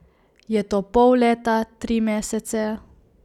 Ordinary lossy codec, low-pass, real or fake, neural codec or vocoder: none; 19.8 kHz; real; none